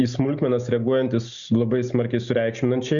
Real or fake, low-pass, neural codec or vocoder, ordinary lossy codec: real; 7.2 kHz; none; Opus, 64 kbps